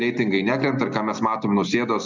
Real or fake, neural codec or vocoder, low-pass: real; none; 7.2 kHz